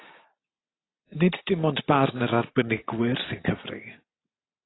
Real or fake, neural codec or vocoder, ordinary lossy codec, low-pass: real; none; AAC, 16 kbps; 7.2 kHz